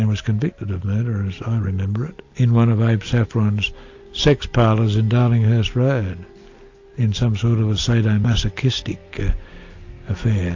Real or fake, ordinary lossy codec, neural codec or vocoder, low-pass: real; AAC, 48 kbps; none; 7.2 kHz